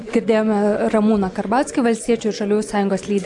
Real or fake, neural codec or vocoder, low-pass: real; none; 10.8 kHz